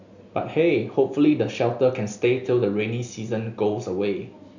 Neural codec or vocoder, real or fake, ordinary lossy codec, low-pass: none; real; none; 7.2 kHz